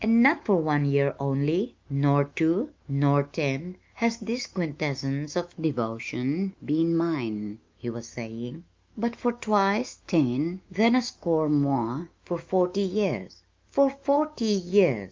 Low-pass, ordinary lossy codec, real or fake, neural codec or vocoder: 7.2 kHz; Opus, 24 kbps; fake; autoencoder, 48 kHz, 128 numbers a frame, DAC-VAE, trained on Japanese speech